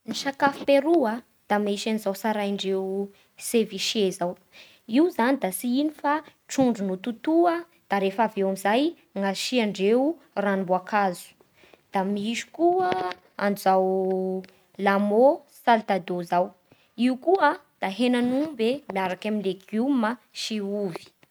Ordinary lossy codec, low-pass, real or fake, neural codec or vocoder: none; none; real; none